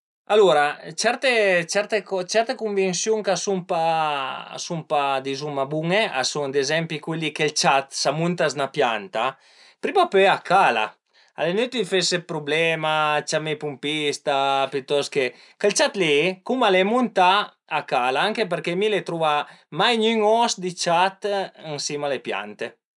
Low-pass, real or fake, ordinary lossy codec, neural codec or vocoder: 10.8 kHz; real; none; none